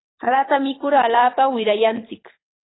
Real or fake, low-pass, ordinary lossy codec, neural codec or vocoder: fake; 7.2 kHz; AAC, 16 kbps; codec, 24 kHz, 6 kbps, HILCodec